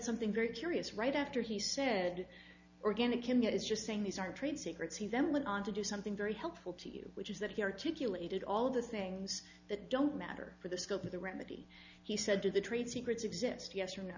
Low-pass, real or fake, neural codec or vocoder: 7.2 kHz; real; none